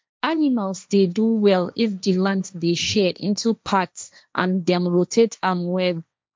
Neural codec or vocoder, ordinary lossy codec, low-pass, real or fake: codec, 16 kHz, 1.1 kbps, Voila-Tokenizer; none; none; fake